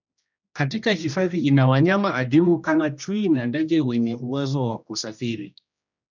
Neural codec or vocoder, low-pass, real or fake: codec, 16 kHz, 1 kbps, X-Codec, HuBERT features, trained on general audio; 7.2 kHz; fake